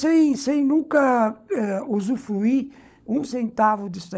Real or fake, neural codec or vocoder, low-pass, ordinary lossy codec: fake; codec, 16 kHz, 16 kbps, FunCodec, trained on LibriTTS, 50 frames a second; none; none